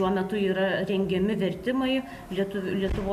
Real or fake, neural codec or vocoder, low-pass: real; none; 14.4 kHz